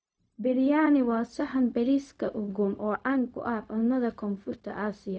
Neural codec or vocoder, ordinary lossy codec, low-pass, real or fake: codec, 16 kHz, 0.4 kbps, LongCat-Audio-Codec; none; none; fake